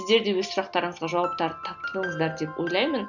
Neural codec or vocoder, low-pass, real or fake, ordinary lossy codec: none; 7.2 kHz; real; none